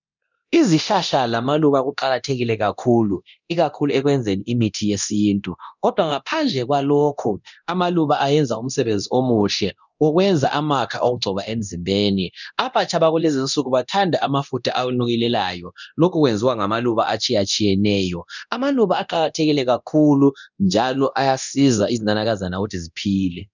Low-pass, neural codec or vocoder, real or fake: 7.2 kHz; codec, 24 kHz, 0.9 kbps, DualCodec; fake